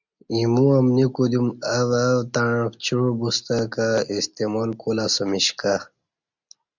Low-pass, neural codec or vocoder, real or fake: 7.2 kHz; none; real